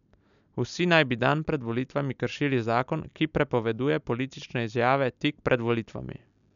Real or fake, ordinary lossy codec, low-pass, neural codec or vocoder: real; none; 7.2 kHz; none